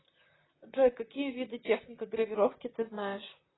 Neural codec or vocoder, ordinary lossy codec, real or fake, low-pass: vocoder, 44.1 kHz, 128 mel bands, Pupu-Vocoder; AAC, 16 kbps; fake; 7.2 kHz